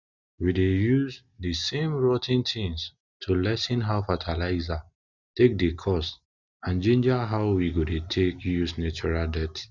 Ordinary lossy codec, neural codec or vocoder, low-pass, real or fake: none; none; 7.2 kHz; real